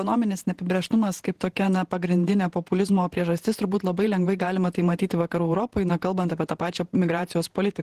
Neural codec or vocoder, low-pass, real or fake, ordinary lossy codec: vocoder, 48 kHz, 128 mel bands, Vocos; 14.4 kHz; fake; Opus, 16 kbps